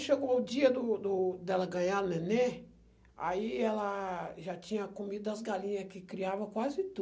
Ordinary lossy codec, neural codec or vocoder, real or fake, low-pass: none; none; real; none